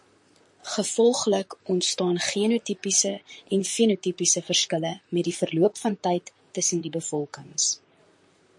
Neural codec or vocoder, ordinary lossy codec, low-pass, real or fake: vocoder, 44.1 kHz, 128 mel bands, Pupu-Vocoder; MP3, 48 kbps; 10.8 kHz; fake